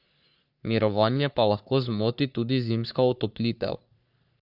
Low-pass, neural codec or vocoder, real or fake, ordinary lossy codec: 5.4 kHz; codec, 44.1 kHz, 3.4 kbps, Pupu-Codec; fake; none